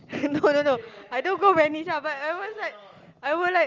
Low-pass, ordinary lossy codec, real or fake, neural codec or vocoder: 7.2 kHz; Opus, 32 kbps; real; none